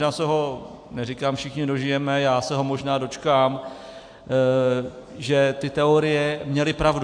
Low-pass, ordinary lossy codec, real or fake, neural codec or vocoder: 9.9 kHz; AAC, 64 kbps; real; none